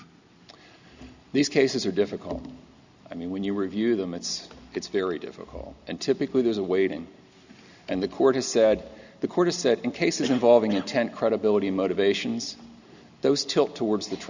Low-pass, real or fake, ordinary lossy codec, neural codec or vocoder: 7.2 kHz; real; Opus, 64 kbps; none